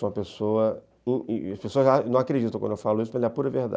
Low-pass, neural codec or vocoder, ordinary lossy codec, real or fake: none; none; none; real